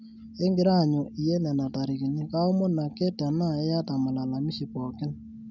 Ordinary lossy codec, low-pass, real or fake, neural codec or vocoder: none; 7.2 kHz; real; none